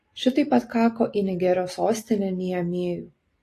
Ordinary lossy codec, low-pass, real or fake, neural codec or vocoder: AAC, 48 kbps; 14.4 kHz; real; none